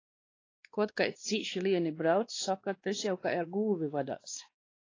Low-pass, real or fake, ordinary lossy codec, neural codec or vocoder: 7.2 kHz; fake; AAC, 32 kbps; codec, 16 kHz, 2 kbps, X-Codec, WavLM features, trained on Multilingual LibriSpeech